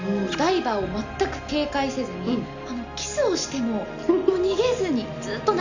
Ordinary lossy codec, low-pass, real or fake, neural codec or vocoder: none; 7.2 kHz; real; none